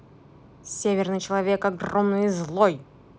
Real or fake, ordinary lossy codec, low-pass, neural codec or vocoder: real; none; none; none